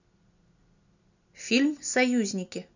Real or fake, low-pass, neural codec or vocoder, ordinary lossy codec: real; 7.2 kHz; none; none